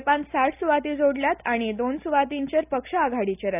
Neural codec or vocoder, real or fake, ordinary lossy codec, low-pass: none; real; none; 3.6 kHz